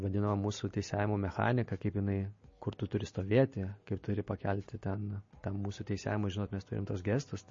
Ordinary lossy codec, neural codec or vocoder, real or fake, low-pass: MP3, 32 kbps; none; real; 7.2 kHz